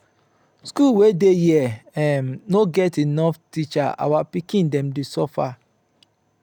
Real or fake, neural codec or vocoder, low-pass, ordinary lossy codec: real; none; 19.8 kHz; none